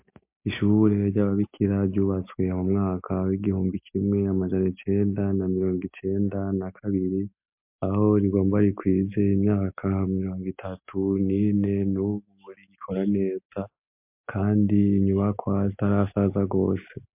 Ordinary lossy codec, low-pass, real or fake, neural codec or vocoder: MP3, 32 kbps; 3.6 kHz; real; none